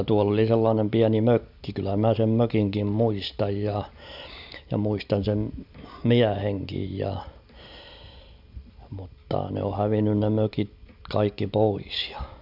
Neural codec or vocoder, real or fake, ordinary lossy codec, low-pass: none; real; none; 5.4 kHz